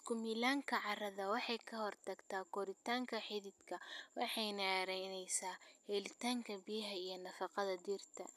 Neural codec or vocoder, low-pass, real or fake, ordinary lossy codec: none; 14.4 kHz; real; none